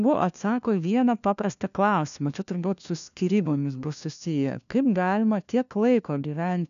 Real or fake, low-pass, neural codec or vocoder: fake; 7.2 kHz; codec, 16 kHz, 1 kbps, FunCodec, trained on LibriTTS, 50 frames a second